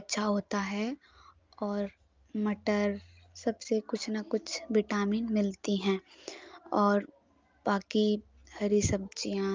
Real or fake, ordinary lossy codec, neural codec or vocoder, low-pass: real; Opus, 24 kbps; none; 7.2 kHz